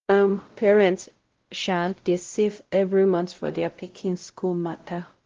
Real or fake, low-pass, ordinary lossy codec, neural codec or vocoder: fake; 7.2 kHz; Opus, 16 kbps; codec, 16 kHz, 0.5 kbps, X-Codec, WavLM features, trained on Multilingual LibriSpeech